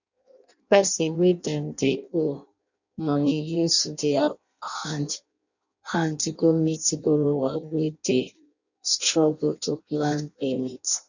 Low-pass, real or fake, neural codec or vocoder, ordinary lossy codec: 7.2 kHz; fake; codec, 16 kHz in and 24 kHz out, 0.6 kbps, FireRedTTS-2 codec; none